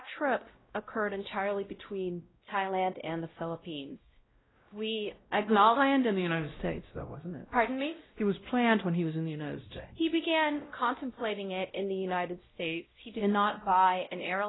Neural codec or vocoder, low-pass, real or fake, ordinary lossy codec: codec, 16 kHz, 0.5 kbps, X-Codec, WavLM features, trained on Multilingual LibriSpeech; 7.2 kHz; fake; AAC, 16 kbps